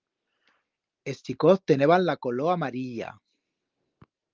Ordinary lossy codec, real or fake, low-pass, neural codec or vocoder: Opus, 32 kbps; real; 7.2 kHz; none